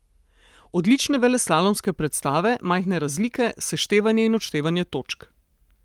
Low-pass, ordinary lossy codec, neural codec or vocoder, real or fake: 19.8 kHz; Opus, 32 kbps; codec, 44.1 kHz, 7.8 kbps, Pupu-Codec; fake